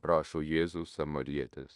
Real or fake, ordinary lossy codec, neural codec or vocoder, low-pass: fake; Opus, 64 kbps; codec, 16 kHz in and 24 kHz out, 0.9 kbps, LongCat-Audio-Codec, fine tuned four codebook decoder; 10.8 kHz